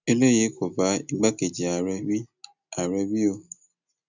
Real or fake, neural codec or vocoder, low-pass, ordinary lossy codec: real; none; 7.2 kHz; none